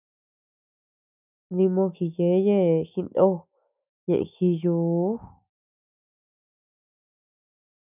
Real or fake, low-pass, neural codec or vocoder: fake; 3.6 kHz; autoencoder, 48 kHz, 128 numbers a frame, DAC-VAE, trained on Japanese speech